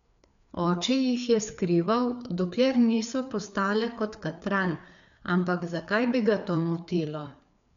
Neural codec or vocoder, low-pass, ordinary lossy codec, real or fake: codec, 16 kHz, 4 kbps, FreqCodec, larger model; 7.2 kHz; none; fake